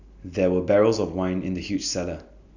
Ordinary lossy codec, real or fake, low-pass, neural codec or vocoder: none; real; 7.2 kHz; none